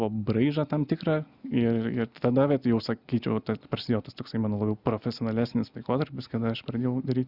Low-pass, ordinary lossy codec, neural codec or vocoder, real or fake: 5.4 kHz; Opus, 64 kbps; none; real